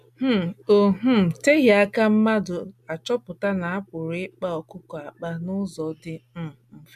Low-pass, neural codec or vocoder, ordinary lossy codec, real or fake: 14.4 kHz; none; MP3, 96 kbps; real